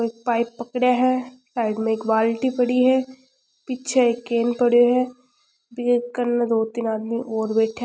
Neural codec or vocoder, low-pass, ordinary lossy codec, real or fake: none; none; none; real